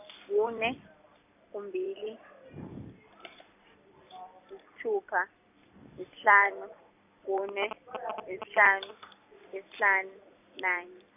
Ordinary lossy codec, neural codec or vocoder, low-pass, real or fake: none; vocoder, 44.1 kHz, 128 mel bands every 256 samples, BigVGAN v2; 3.6 kHz; fake